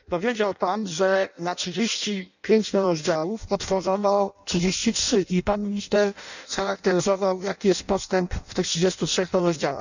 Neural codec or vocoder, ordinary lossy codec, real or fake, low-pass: codec, 16 kHz in and 24 kHz out, 0.6 kbps, FireRedTTS-2 codec; none; fake; 7.2 kHz